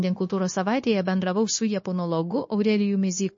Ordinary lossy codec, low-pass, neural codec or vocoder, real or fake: MP3, 32 kbps; 7.2 kHz; codec, 16 kHz, 0.9 kbps, LongCat-Audio-Codec; fake